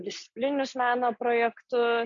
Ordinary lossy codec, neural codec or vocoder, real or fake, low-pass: MP3, 64 kbps; none; real; 7.2 kHz